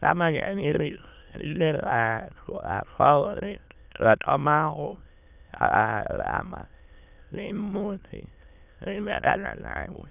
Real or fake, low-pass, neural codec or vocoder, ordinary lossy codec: fake; 3.6 kHz; autoencoder, 22.05 kHz, a latent of 192 numbers a frame, VITS, trained on many speakers; none